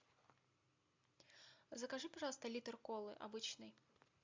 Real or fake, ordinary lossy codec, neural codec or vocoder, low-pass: real; MP3, 64 kbps; none; 7.2 kHz